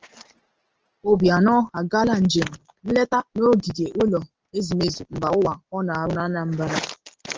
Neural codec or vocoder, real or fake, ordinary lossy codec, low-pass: none; real; Opus, 16 kbps; 7.2 kHz